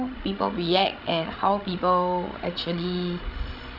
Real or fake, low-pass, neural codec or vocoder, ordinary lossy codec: fake; 5.4 kHz; codec, 16 kHz, 16 kbps, FreqCodec, larger model; none